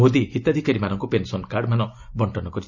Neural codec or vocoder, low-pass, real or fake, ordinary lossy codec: none; 7.2 kHz; real; none